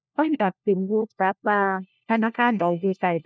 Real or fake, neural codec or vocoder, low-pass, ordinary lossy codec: fake; codec, 16 kHz, 1 kbps, FunCodec, trained on LibriTTS, 50 frames a second; none; none